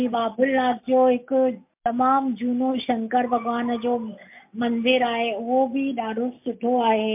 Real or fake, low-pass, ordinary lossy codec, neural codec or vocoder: real; 3.6 kHz; MP3, 32 kbps; none